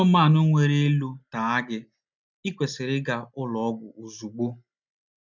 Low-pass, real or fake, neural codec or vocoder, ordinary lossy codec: 7.2 kHz; real; none; none